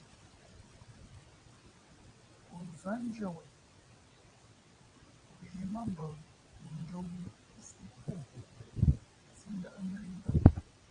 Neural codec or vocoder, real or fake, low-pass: vocoder, 22.05 kHz, 80 mel bands, WaveNeXt; fake; 9.9 kHz